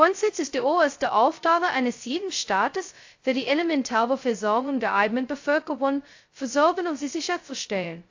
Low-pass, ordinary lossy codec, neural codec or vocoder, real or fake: 7.2 kHz; AAC, 48 kbps; codec, 16 kHz, 0.2 kbps, FocalCodec; fake